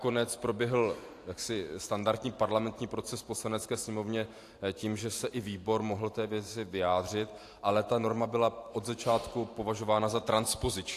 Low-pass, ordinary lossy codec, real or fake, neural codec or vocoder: 14.4 kHz; AAC, 64 kbps; fake; vocoder, 44.1 kHz, 128 mel bands every 512 samples, BigVGAN v2